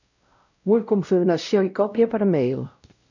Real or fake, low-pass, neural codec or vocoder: fake; 7.2 kHz; codec, 16 kHz, 0.5 kbps, X-Codec, WavLM features, trained on Multilingual LibriSpeech